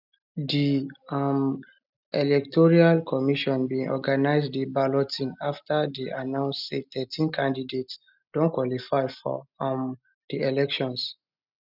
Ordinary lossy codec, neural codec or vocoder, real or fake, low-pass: none; none; real; 5.4 kHz